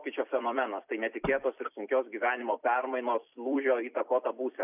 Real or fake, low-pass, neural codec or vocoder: fake; 3.6 kHz; vocoder, 44.1 kHz, 128 mel bands every 256 samples, BigVGAN v2